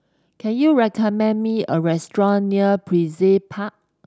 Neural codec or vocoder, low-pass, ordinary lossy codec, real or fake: none; none; none; real